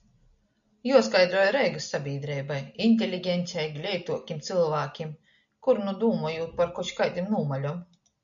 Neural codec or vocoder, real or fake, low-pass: none; real; 7.2 kHz